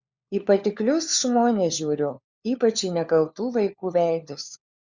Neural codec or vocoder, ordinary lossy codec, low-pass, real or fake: codec, 16 kHz, 4 kbps, FunCodec, trained on LibriTTS, 50 frames a second; Opus, 64 kbps; 7.2 kHz; fake